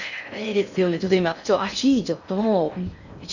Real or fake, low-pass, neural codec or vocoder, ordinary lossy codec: fake; 7.2 kHz; codec, 16 kHz in and 24 kHz out, 0.6 kbps, FocalCodec, streaming, 4096 codes; none